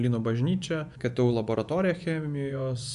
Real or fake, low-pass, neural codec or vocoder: fake; 10.8 kHz; vocoder, 24 kHz, 100 mel bands, Vocos